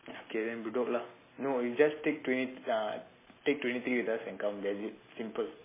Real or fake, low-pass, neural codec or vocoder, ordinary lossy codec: real; 3.6 kHz; none; MP3, 16 kbps